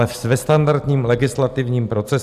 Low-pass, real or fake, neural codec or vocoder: 14.4 kHz; real; none